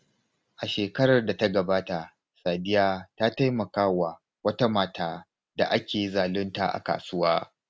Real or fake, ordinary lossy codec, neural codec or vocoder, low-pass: real; none; none; none